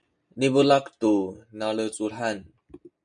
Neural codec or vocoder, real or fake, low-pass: none; real; 9.9 kHz